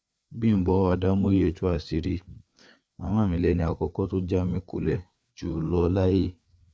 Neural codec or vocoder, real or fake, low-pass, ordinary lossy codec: codec, 16 kHz, 4 kbps, FreqCodec, larger model; fake; none; none